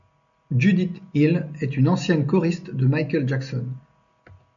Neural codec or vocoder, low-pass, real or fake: none; 7.2 kHz; real